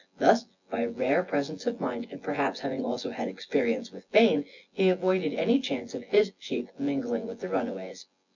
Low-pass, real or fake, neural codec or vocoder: 7.2 kHz; fake; vocoder, 24 kHz, 100 mel bands, Vocos